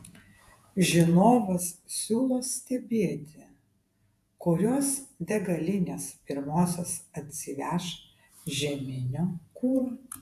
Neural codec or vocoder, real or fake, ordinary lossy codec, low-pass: vocoder, 48 kHz, 128 mel bands, Vocos; fake; AAC, 96 kbps; 14.4 kHz